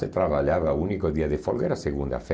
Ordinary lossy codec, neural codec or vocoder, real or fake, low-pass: none; none; real; none